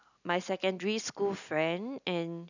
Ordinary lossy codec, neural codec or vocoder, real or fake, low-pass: none; none; real; 7.2 kHz